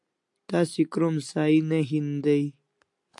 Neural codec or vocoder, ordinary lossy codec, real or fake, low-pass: none; AAC, 64 kbps; real; 10.8 kHz